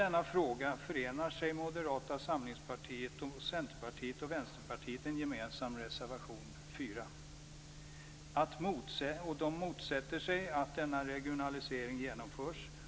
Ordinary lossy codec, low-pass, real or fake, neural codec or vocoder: none; none; real; none